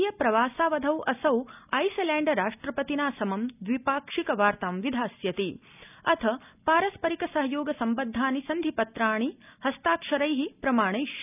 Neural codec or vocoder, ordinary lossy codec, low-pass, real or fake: none; none; 3.6 kHz; real